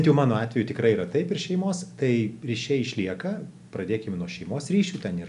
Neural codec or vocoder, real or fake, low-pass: none; real; 10.8 kHz